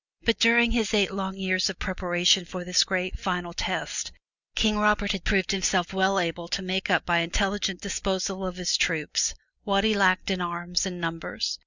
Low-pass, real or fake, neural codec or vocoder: 7.2 kHz; real; none